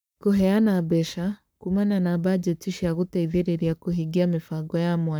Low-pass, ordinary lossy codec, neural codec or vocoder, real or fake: none; none; codec, 44.1 kHz, 7.8 kbps, Pupu-Codec; fake